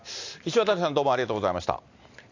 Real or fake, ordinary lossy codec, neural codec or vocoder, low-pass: real; AAC, 48 kbps; none; 7.2 kHz